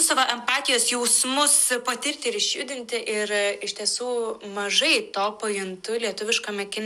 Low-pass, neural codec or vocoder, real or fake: 14.4 kHz; none; real